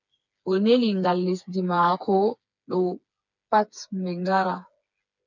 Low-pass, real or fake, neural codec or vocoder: 7.2 kHz; fake; codec, 16 kHz, 4 kbps, FreqCodec, smaller model